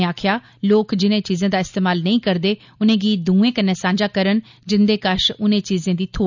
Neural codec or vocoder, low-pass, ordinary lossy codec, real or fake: none; 7.2 kHz; none; real